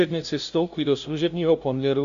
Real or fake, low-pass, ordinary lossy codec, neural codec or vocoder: fake; 7.2 kHz; Opus, 64 kbps; codec, 16 kHz, 0.5 kbps, FunCodec, trained on LibriTTS, 25 frames a second